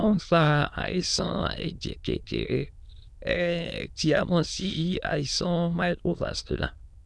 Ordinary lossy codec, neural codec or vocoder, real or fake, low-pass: none; autoencoder, 22.05 kHz, a latent of 192 numbers a frame, VITS, trained on many speakers; fake; none